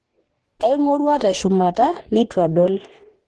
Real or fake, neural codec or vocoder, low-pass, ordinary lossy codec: fake; codec, 44.1 kHz, 2.6 kbps, DAC; 10.8 kHz; Opus, 16 kbps